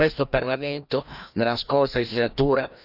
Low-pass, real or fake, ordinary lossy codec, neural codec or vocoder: 5.4 kHz; fake; none; codec, 16 kHz, 1 kbps, FreqCodec, larger model